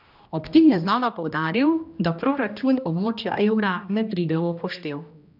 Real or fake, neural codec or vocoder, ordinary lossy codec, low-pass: fake; codec, 16 kHz, 1 kbps, X-Codec, HuBERT features, trained on general audio; none; 5.4 kHz